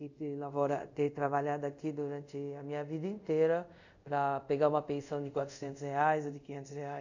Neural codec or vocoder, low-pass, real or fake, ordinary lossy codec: codec, 24 kHz, 0.5 kbps, DualCodec; 7.2 kHz; fake; none